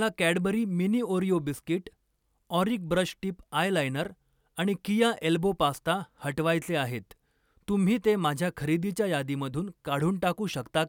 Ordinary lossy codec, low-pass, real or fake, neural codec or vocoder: none; 19.8 kHz; real; none